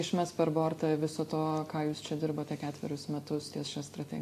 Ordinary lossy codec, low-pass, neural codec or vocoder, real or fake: AAC, 64 kbps; 14.4 kHz; none; real